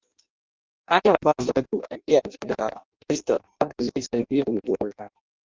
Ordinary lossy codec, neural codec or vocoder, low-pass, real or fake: Opus, 32 kbps; codec, 16 kHz in and 24 kHz out, 0.6 kbps, FireRedTTS-2 codec; 7.2 kHz; fake